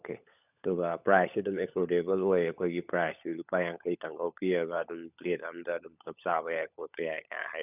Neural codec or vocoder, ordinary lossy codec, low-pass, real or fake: codec, 16 kHz, 16 kbps, FunCodec, trained on LibriTTS, 50 frames a second; none; 3.6 kHz; fake